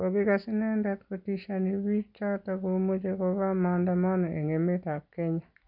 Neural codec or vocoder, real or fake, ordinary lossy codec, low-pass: none; real; none; 5.4 kHz